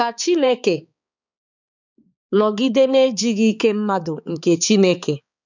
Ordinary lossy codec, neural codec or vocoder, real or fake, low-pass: none; codec, 16 kHz, 4 kbps, X-Codec, HuBERT features, trained on balanced general audio; fake; 7.2 kHz